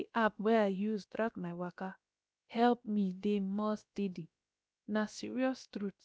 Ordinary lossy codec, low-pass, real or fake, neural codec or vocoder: none; none; fake; codec, 16 kHz, about 1 kbps, DyCAST, with the encoder's durations